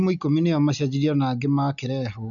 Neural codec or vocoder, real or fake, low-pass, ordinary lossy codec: none; real; 7.2 kHz; none